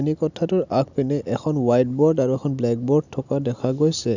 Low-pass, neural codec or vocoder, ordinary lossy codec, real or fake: 7.2 kHz; none; none; real